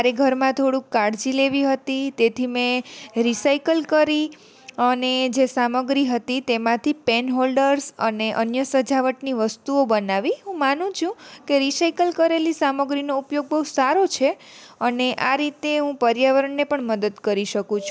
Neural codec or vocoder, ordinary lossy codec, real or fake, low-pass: none; none; real; none